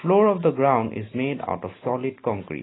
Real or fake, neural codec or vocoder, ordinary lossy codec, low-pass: real; none; AAC, 16 kbps; 7.2 kHz